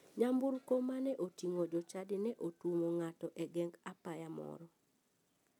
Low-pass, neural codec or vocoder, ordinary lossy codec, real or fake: 19.8 kHz; none; none; real